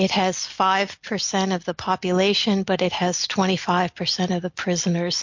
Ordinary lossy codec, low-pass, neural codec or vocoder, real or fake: MP3, 48 kbps; 7.2 kHz; none; real